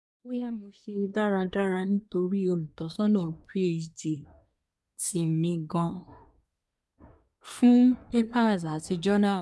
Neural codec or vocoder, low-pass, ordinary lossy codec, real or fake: codec, 24 kHz, 1 kbps, SNAC; none; none; fake